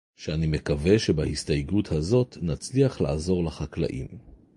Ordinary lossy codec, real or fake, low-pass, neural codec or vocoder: MP3, 48 kbps; real; 10.8 kHz; none